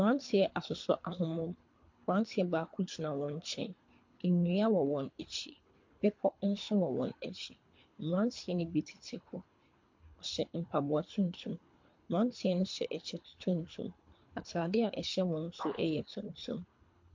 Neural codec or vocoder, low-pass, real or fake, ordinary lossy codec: codec, 24 kHz, 3 kbps, HILCodec; 7.2 kHz; fake; MP3, 48 kbps